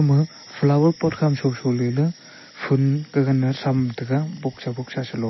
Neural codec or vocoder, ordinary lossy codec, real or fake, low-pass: none; MP3, 24 kbps; real; 7.2 kHz